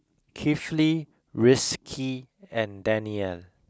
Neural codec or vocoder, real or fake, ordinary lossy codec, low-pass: none; real; none; none